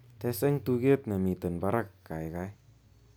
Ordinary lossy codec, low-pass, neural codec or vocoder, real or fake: none; none; none; real